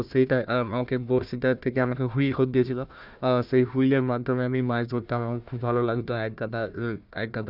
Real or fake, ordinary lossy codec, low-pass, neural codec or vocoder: fake; none; 5.4 kHz; codec, 16 kHz, 1 kbps, FunCodec, trained on Chinese and English, 50 frames a second